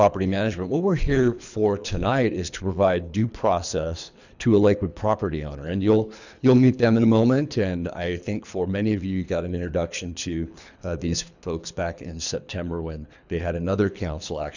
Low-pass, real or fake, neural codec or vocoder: 7.2 kHz; fake; codec, 24 kHz, 3 kbps, HILCodec